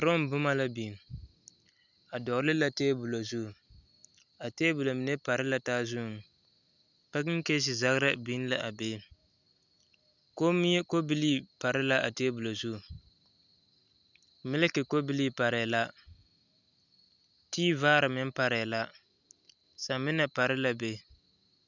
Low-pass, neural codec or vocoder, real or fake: 7.2 kHz; none; real